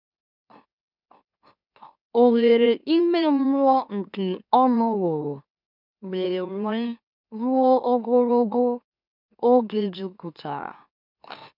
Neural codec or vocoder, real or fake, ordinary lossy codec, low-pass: autoencoder, 44.1 kHz, a latent of 192 numbers a frame, MeloTTS; fake; none; 5.4 kHz